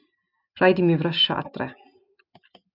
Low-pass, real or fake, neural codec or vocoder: 5.4 kHz; real; none